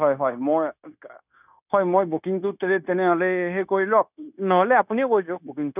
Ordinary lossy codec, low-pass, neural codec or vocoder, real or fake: none; 3.6 kHz; codec, 16 kHz, 0.9 kbps, LongCat-Audio-Codec; fake